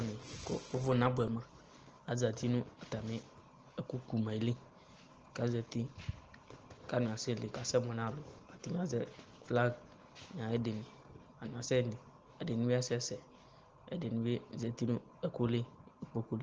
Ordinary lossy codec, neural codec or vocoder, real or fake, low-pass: Opus, 16 kbps; none; real; 7.2 kHz